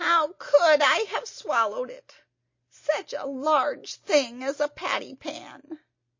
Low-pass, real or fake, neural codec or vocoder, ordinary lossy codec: 7.2 kHz; real; none; MP3, 32 kbps